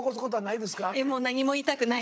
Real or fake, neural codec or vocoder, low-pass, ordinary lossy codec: fake; codec, 16 kHz, 8 kbps, FreqCodec, larger model; none; none